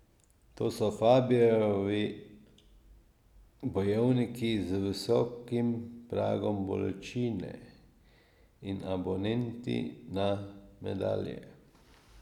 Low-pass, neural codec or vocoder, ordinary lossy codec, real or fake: 19.8 kHz; none; none; real